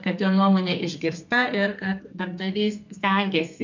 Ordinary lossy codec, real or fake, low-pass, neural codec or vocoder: MP3, 64 kbps; fake; 7.2 kHz; codec, 32 kHz, 1.9 kbps, SNAC